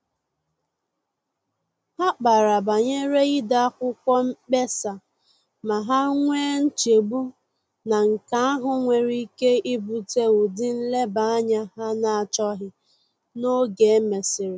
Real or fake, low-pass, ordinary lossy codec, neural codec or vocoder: real; none; none; none